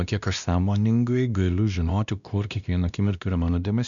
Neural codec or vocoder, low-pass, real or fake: codec, 16 kHz, 1 kbps, X-Codec, WavLM features, trained on Multilingual LibriSpeech; 7.2 kHz; fake